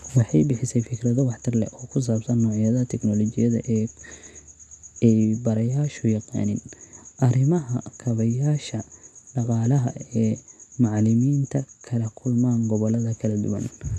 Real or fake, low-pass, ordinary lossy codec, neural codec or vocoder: real; none; none; none